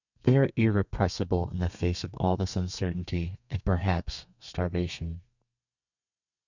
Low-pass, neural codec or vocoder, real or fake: 7.2 kHz; codec, 32 kHz, 1.9 kbps, SNAC; fake